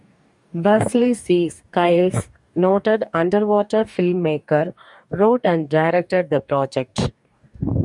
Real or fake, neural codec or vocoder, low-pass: fake; codec, 44.1 kHz, 2.6 kbps, DAC; 10.8 kHz